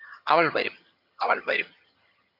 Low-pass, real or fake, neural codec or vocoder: 5.4 kHz; fake; vocoder, 22.05 kHz, 80 mel bands, HiFi-GAN